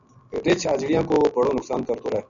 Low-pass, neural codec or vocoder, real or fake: 7.2 kHz; none; real